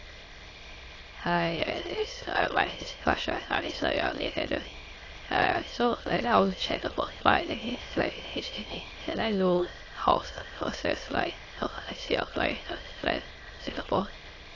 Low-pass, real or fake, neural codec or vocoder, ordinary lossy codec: 7.2 kHz; fake; autoencoder, 22.05 kHz, a latent of 192 numbers a frame, VITS, trained on many speakers; AAC, 32 kbps